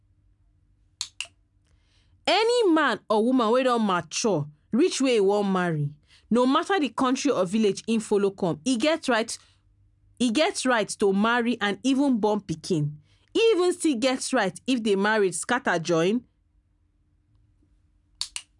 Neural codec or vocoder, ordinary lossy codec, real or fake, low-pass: none; none; real; 10.8 kHz